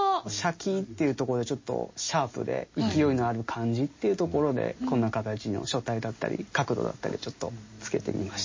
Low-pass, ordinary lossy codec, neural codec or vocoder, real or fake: 7.2 kHz; MP3, 32 kbps; none; real